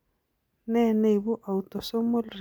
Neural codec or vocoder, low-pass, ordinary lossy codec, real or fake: none; none; none; real